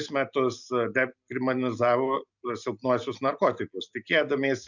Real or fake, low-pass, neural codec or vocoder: real; 7.2 kHz; none